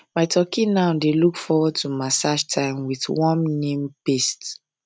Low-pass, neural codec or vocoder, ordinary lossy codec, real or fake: none; none; none; real